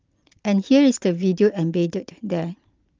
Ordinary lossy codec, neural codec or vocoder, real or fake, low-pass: Opus, 32 kbps; none; real; 7.2 kHz